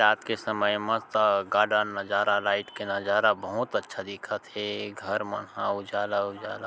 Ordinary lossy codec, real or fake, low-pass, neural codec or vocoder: none; real; none; none